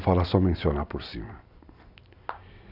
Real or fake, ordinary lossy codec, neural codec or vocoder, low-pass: real; none; none; 5.4 kHz